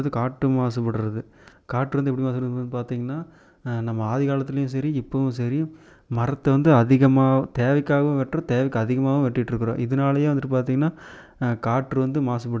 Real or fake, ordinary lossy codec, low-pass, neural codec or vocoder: real; none; none; none